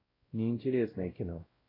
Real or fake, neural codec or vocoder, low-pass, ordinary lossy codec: fake; codec, 16 kHz, 0.5 kbps, X-Codec, WavLM features, trained on Multilingual LibriSpeech; 5.4 kHz; AAC, 24 kbps